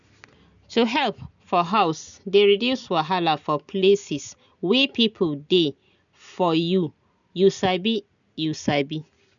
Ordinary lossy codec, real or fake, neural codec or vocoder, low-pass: none; real; none; 7.2 kHz